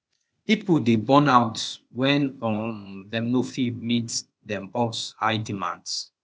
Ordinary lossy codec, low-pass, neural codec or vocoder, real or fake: none; none; codec, 16 kHz, 0.8 kbps, ZipCodec; fake